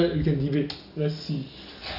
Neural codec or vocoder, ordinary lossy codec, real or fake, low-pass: none; none; real; 5.4 kHz